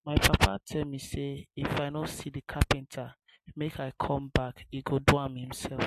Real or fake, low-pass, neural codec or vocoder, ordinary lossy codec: real; 14.4 kHz; none; MP3, 64 kbps